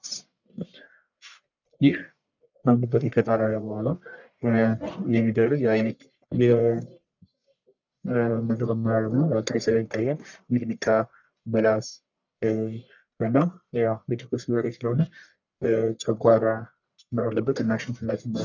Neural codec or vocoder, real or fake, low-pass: codec, 44.1 kHz, 1.7 kbps, Pupu-Codec; fake; 7.2 kHz